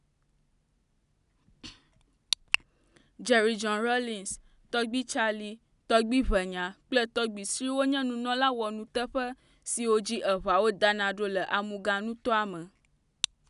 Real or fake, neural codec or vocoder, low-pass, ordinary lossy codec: real; none; 10.8 kHz; none